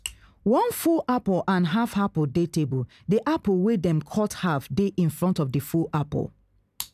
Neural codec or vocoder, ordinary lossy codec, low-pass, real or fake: none; none; 14.4 kHz; real